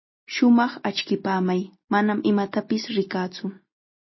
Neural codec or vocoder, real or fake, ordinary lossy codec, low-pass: none; real; MP3, 24 kbps; 7.2 kHz